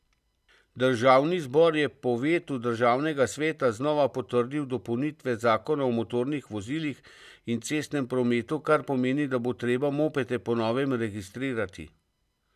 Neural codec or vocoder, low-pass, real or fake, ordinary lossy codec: none; 14.4 kHz; real; none